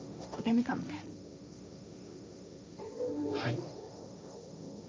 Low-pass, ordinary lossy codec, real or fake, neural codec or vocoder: none; none; fake; codec, 16 kHz, 1.1 kbps, Voila-Tokenizer